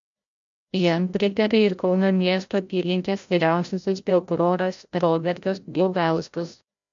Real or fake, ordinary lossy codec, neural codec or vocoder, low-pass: fake; MP3, 64 kbps; codec, 16 kHz, 0.5 kbps, FreqCodec, larger model; 7.2 kHz